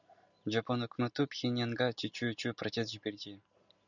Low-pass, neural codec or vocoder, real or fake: 7.2 kHz; none; real